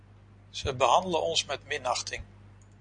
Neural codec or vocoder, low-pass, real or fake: none; 9.9 kHz; real